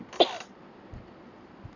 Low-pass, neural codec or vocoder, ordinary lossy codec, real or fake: 7.2 kHz; none; none; real